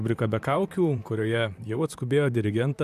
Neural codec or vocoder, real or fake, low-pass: vocoder, 44.1 kHz, 128 mel bands, Pupu-Vocoder; fake; 14.4 kHz